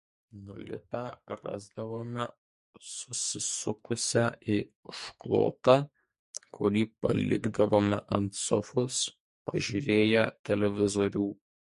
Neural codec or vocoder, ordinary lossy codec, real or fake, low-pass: codec, 44.1 kHz, 2.6 kbps, SNAC; MP3, 48 kbps; fake; 14.4 kHz